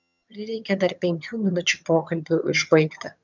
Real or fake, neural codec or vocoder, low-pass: fake; vocoder, 22.05 kHz, 80 mel bands, HiFi-GAN; 7.2 kHz